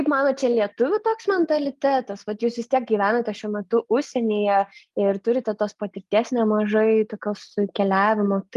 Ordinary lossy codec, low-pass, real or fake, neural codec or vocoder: Opus, 32 kbps; 14.4 kHz; real; none